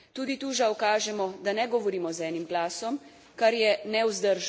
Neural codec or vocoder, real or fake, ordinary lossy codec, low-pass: none; real; none; none